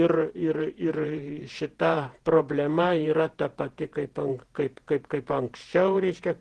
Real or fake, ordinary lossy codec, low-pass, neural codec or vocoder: fake; Opus, 16 kbps; 10.8 kHz; vocoder, 48 kHz, 128 mel bands, Vocos